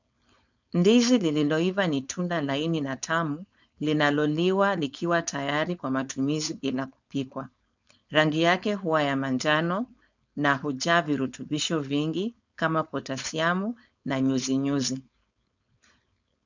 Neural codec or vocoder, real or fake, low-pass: codec, 16 kHz, 4.8 kbps, FACodec; fake; 7.2 kHz